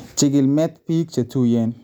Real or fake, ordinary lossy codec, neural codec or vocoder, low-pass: real; none; none; 19.8 kHz